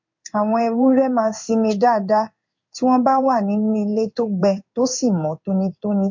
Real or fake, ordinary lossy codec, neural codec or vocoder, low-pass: fake; MP3, 48 kbps; codec, 16 kHz in and 24 kHz out, 1 kbps, XY-Tokenizer; 7.2 kHz